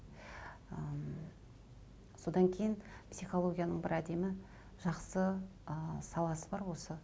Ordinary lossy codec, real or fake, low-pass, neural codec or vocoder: none; real; none; none